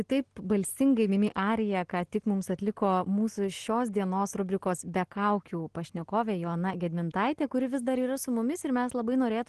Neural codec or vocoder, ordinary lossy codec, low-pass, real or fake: none; Opus, 16 kbps; 10.8 kHz; real